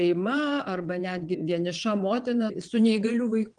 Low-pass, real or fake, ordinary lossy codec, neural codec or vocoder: 9.9 kHz; fake; Opus, 24 kbps; vocoder, 22.05 kHz, 80 mel bands, WaveNeXt